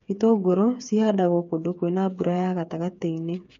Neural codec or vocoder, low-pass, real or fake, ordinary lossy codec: codec, 16 kHz, 8 kbps, FreqCodec, smaller model; 7.2 kHz; fake; MP3, 48 kbps